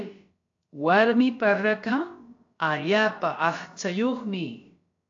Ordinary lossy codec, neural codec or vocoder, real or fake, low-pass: AAC, 48 kbps; codec, 16 kHz, about 1 kbps, DyCAST, with the encoder's durations; fake; 7.2 kHz